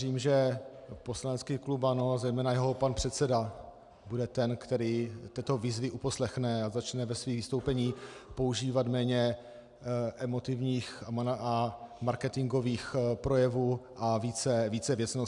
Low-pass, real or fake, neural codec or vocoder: 10.8 kHz; real; none